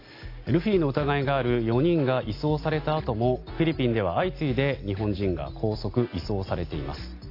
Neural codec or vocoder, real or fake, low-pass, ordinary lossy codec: none; real; 5.4 kHz; AAC, 32 kbps